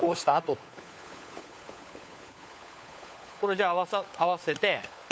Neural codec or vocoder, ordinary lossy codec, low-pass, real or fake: codec, 16 kHz, 16 kbps, FunCodec, trained on LibriTTS, 50 frames a second; none; none; fake